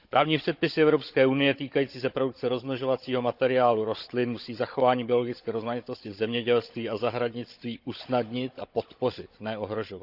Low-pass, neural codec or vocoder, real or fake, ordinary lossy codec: 5.4 kHz; codec, 16 kHz, 16 kbps, FunCodec, trained on Chinese and English, 50 frames a second; fake; Opus, 64 kbps